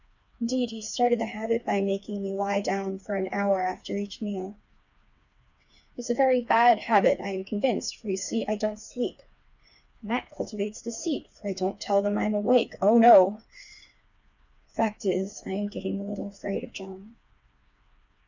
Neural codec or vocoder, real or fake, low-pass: codec, 16 kHz, 4 kbps, FreqCodec, smaller model; fake; 7.2 kHz